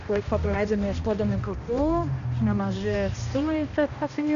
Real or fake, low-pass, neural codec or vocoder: fake; 7.2 kHz; codec, 16 kHz, 1 kbps, X-Codec, HuBERT features, trained on balanced general audio